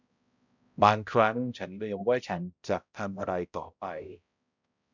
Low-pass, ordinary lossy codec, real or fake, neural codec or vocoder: 7.2 kHz; none; fake; codec, 16 kHz, 0.5 kbps, X-Codec, HuBERT features, trained on balanced general audio